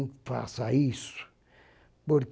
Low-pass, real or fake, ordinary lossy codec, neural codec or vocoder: none; real; none; none